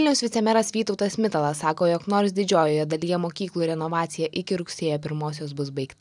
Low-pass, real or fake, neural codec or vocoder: 10.8 kHz; real; none